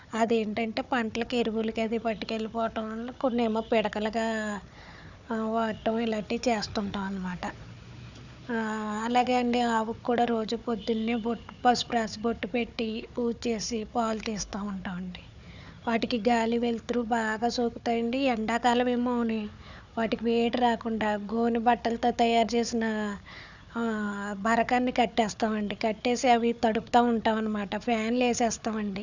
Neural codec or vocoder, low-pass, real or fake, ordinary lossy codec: codec, 16 kHz, 4 kbps, FunCodec, trained on Chinese and English, 50 frames a second; 7.2 kHz; fake; none